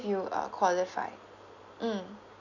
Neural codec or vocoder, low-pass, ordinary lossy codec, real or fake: none; 7.2 kHz; none; real